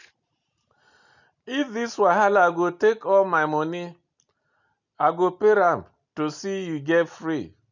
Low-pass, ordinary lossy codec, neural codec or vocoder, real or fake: 7.2 kHz; none; none; real